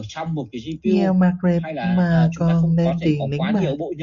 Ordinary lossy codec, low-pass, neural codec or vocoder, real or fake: Opus, 64 kbps; 7.2 kHz; none; real